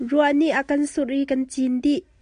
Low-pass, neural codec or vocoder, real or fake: 9.9 kHz; none; real